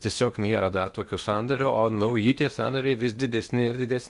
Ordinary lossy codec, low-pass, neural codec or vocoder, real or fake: Opus, 64 kbps; 10.8 kHz; codec, 16 kHz in and 24 kHz out, 0.8 kbps, FocalCodec, streaming, 65536 codes; fake